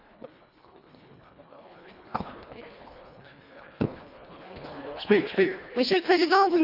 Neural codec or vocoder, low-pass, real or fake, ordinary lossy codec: codec, 24 kHz, 1.5 kbps, HILCodec; 5.4 kHz; fake; none